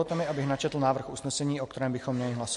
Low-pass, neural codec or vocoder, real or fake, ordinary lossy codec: 14.4 kHz; none; real; MP3, 48 kbps